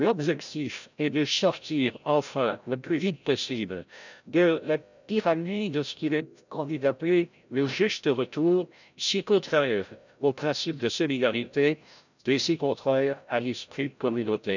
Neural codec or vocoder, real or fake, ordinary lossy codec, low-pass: codec, 16 kHz, 0.5 kbps, FreqCodec, larger model; fake; none; 7.2 kHz